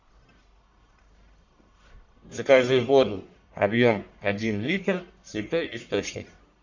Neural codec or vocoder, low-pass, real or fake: codec, 44.1 kHz, 1.7 kbps, Pupu-Codec; 7.2 kHz; fake